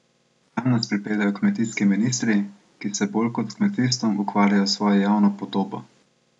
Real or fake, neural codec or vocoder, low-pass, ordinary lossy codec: real; none; 10.8 kHz; none